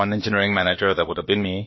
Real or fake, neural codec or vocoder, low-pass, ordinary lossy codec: fake; codec, 16 kHz, about 1 kbps, DyCAST, with the encoder's durations; 7.2 kHz; MP3, 24 kbps